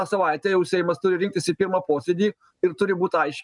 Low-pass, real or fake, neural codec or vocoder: 10.8 kHz; real; none